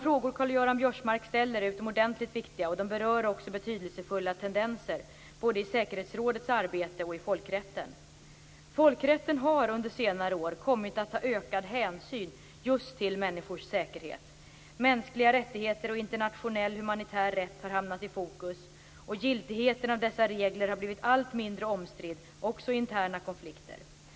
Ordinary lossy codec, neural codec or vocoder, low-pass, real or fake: none; none; none; real